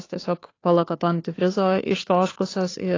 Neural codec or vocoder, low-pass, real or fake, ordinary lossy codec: codec, 16 kHz, 2 kbps, FunCodec, trained on Chinese and English, 25 frames a second; 7.2 kHz; fake; AAC, 32 kbps